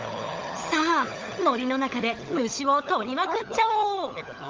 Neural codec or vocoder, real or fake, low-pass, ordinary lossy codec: codec, 16 kHz, 16 kbps, FunCodec, trained on LibriTTS, 50 frames a second; fake; 7.2 kHz; Opus, 32 kbps